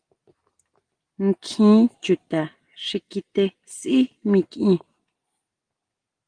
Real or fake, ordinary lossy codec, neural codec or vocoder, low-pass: real; Opus, 24 kbps; none; 9.9 kHz